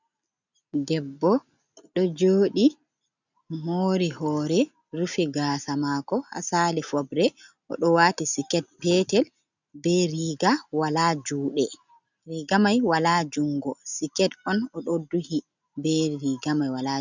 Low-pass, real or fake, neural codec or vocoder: 7.2 kHz; real; none